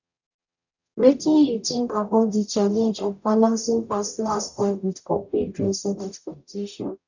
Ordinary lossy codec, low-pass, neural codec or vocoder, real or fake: none; 7.2 kHz; codec, 44.1 kHz, 0.9 kbps, DAC; fake